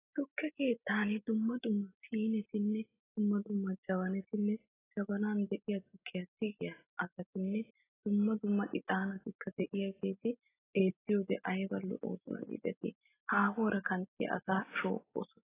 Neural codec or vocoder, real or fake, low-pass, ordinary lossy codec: none; real; 3.6 kHz; AAC, 16 kbps